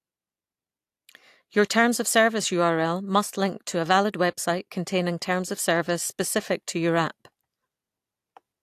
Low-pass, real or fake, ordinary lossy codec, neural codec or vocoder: 14.4 kHz; real; AAC, 64 kbps; none